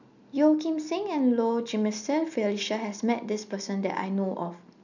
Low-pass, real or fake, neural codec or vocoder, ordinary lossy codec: 7.2 kHz; real; none; none